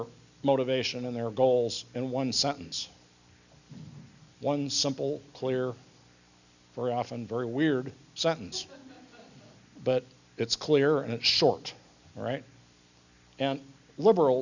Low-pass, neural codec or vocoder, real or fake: 7.2 kHz; none; real